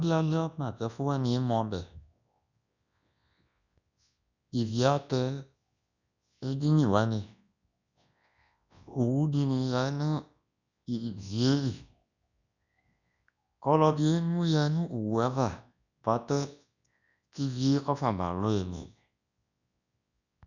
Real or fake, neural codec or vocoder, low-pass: fake; codec, 24 kHz, 0.9 kbps, WavTokenizer, large speech release; 7.2 kHz